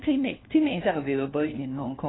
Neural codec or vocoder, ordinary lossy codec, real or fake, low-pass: codec, 16 kHz, 1 kbps, FunCodec, trained on LibriTTS, 50 frames a second; AAC, 16 kbps; fake; 7.2 kHz